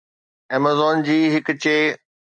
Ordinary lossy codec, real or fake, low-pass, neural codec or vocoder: MP3, 96 kbps; real; 9.9 kHz; none